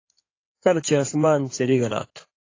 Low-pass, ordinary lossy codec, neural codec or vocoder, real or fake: 7.2 kHz; AAC, 32 kbps; codec, 16 kHz in and 24 kHz out, 2.2 kbps, FireRedTTS-2 codec; fake